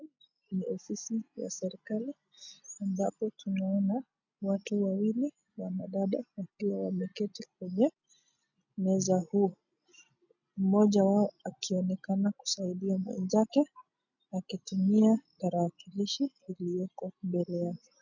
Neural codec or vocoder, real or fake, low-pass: none; real; 7.2 kHz